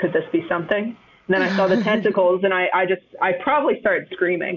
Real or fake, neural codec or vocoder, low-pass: real; none; 7.2 kHz